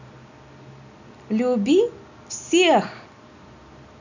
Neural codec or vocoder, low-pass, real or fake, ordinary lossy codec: none; 7.2 kHz; real; none